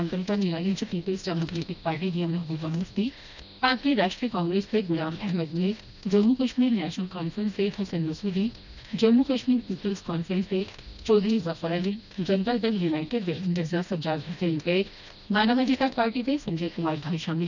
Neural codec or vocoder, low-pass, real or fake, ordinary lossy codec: codec, 16 kHz, 1 kbps, FreqCodec, smaller model; 7.2 kHz; fake; none